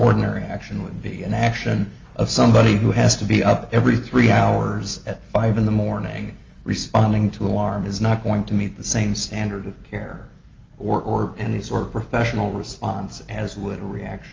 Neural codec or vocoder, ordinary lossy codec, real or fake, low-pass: none; Opus, 32 kbps; real; 7.2 kHz